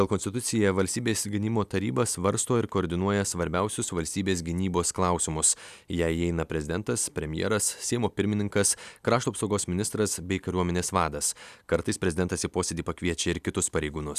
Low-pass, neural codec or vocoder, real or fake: 14.4 kHz; none; real